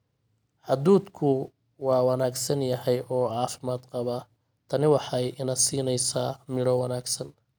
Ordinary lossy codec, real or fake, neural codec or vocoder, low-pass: none; real; none; none